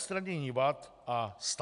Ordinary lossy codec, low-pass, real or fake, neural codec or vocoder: AAC, 96 kbps; 10.8 kHz; real; none